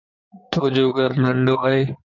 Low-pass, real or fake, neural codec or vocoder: 7.2 kHz; fake; codec, 16 kHz in and 24 kHz out, 2.2 kbps, FireRedTTS-2 codec